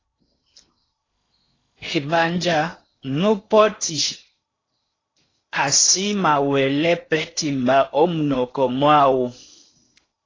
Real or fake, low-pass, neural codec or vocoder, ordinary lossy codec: fake; 7.2 kHz; codec, 16 kHz in and 24 kHz out, 0.8 kbps, FocalCodec, streaming, 65536 codes; AAC, 32 kbps